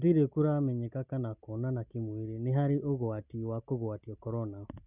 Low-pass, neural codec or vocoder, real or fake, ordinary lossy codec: 3.6 kHz; none; real; none